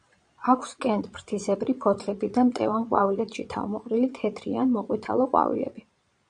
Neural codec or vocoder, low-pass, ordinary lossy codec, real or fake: vocoder, 22.05 kHz, 80 mel bands, Vocos; 9.9 kHz; AAC, 64 kbps; fake